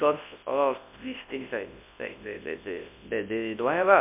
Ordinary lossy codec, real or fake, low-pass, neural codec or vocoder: MP3, 32 kbps; fake; 3.6 kHz; codec, 24 kHz, 0.9 kbps, WavTokenizer, large speech release